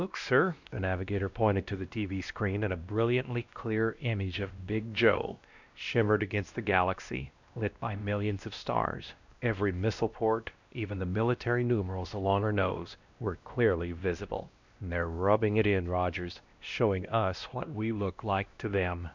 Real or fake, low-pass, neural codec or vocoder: fake; 7.2 kHz; codec, 16 kHz, 1 kbps, X-Codec, WavLM features, trained on Multilingual LibriSpeech